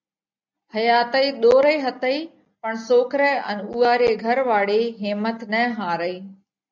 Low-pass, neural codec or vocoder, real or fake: 7.2 kHz; none; real